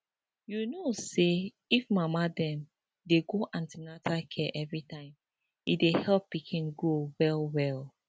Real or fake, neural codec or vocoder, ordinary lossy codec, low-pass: real; none; none; none